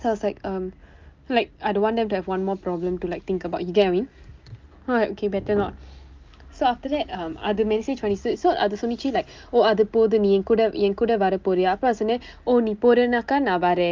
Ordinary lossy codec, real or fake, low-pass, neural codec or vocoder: Opus, 32 kbps; real; 7.2 kHz; none